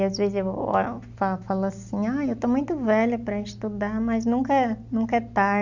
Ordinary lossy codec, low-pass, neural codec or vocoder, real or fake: none; 7.2 kHz; none; real